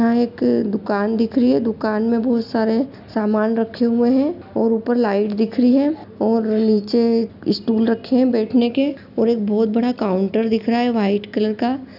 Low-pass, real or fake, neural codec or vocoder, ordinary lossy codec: 5.4 kHz; real; none; none